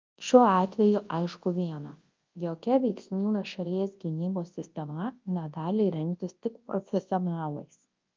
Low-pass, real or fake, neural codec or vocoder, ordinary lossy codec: 7.2 kHz; fake; codec, 24 kHz, 0.9 kbps, WavTokenizer, large speech release; Opus, 32 kbps